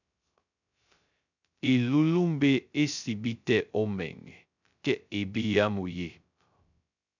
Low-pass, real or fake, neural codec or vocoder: 7.2 kHz; fake; codec, 16 kHz, 0.2 kbps, FocalCodec